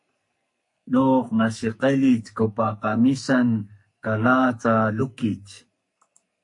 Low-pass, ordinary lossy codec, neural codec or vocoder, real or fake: 10.8 kHz; MP3, 48 kbps; codec, 44.1 kHz, 2.6 kbps, SNAC; fake